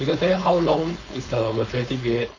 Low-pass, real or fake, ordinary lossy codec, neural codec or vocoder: 7.2 kHz; fake; AAC, 32 kbps; codec, 16 kHz, 4.8 kbps, FACodec